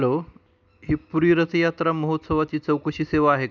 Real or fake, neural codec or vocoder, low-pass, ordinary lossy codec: real; none; 7.2 kHz; none